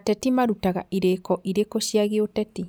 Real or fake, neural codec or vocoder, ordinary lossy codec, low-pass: real; none; none; none